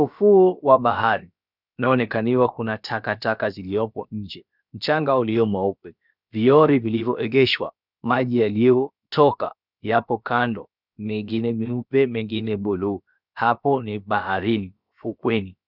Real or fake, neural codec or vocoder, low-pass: fake; codec, 16 kHz, about 1 kbps, DyCAST, with the encoder's durations; 5.4 kHz